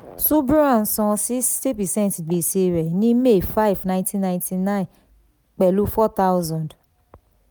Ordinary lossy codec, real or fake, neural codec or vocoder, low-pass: none; real; none; none